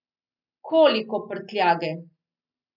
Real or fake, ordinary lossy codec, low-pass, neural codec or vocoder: real; none; 5.4 kHz; none